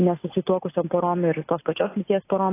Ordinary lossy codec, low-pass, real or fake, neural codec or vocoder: AAC, 24 kbps; 3.6 kHz; real; none